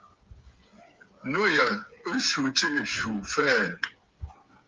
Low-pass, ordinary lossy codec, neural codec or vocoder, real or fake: 7.2 kHz; Opus, 32 kbps; codec, 16 kHz, 8 kbps, FreqCodec, smaller model; fake